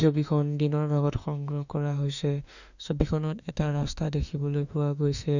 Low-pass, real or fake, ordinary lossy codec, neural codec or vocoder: 7.2 kHz; fake; none; autoencoder, 48 kHz, 32 numbers a frame, DAC-VAE, trained on Japanese speech